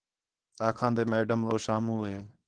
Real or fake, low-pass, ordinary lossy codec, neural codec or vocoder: fake; 9.9 kHz; Opus, 16 kbps; codec, 24 kHz, 0.9 kbps, WavTokenizer, small release